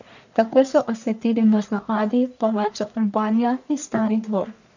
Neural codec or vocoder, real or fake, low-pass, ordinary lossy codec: codec, 44.1 kHz, 1.7 kbps, Pupu-Codec; fake; 7.2 kHz; none